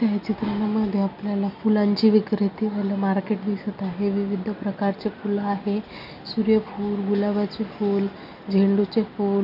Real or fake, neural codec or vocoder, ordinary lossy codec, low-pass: real; none; none; 5.4 kHz